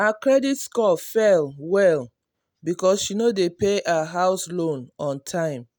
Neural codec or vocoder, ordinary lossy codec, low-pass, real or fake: none; none; none; real